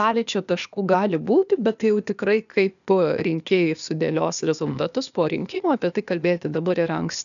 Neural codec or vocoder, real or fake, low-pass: codec, 16 kHz, 0.8 kbps, ZipCodec; fake; 7.2 kHz